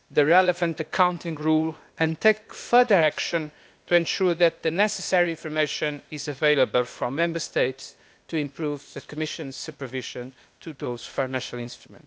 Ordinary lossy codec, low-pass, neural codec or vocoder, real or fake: none; none; codec, 16 kHz, 0.8 kbps, ZipCodec; fake